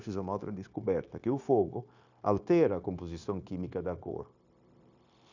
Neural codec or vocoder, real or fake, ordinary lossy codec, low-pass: codec, 16 kHz, 0.9 kbps, LongCat-Audio-Codec; fake; none; 7.2 kHz